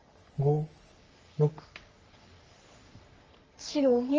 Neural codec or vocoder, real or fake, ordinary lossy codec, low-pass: codec, 44.1 kHz, 3.4 kbps, Pupu-Codec; fake; Opus, 24 kbps; 7.2 kHz